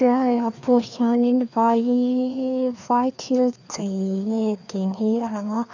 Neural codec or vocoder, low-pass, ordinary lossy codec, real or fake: codec, 16 kHz, 2 kbps, FreqCodec, larger model; 7.2 kHz; none; fake